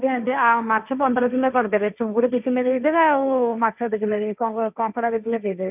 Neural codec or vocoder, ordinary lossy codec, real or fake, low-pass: codec, 16 kHz, 1.1 kbps, Voila-Tokenizer; none; fake; 3.6 kHz